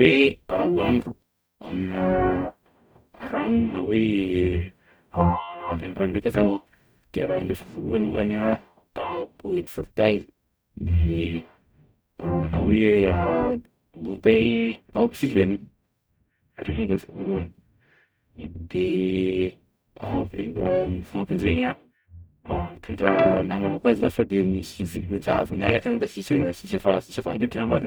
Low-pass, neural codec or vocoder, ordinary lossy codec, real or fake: none; codec, 44.1 kHz, 0.9 kbps, DAC; none; fake